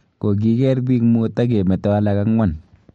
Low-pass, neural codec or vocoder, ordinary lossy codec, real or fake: 19.8 kHz; none; MP3, 48 kbps; real